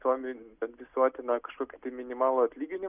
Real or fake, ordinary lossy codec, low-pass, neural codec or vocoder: real; Opus, 64 kbps; 3.6 kHz; none